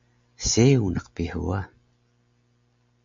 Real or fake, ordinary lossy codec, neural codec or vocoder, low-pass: real; MP3, 64 kbps; none; 7.2 kHz